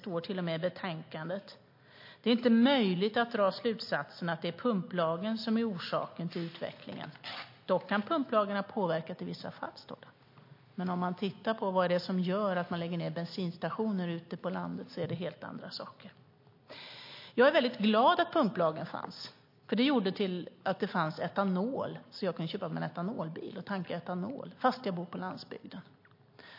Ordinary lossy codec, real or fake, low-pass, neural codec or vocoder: MP3, 32 kbps; real; 5.4 kHz; none